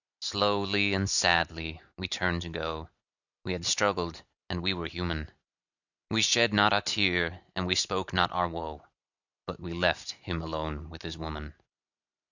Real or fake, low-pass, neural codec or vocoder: real; 7.2 kHz; none